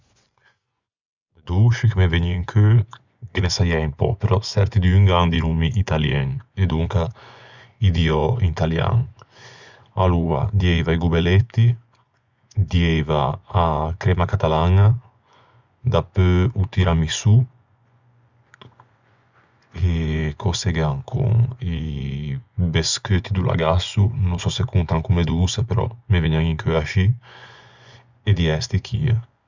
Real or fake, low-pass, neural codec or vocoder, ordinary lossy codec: fake; 7.2 kHz; vocoder, 24 kHz, 100 mel bands, Vocos; Opus, 64 kbps